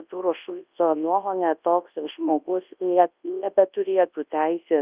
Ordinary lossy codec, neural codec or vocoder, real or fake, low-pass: Opus, 24 kbps; codec, 24 kHz, 0.9 kbps, WavTokenizer, large speech release; fake; 3.6 kHz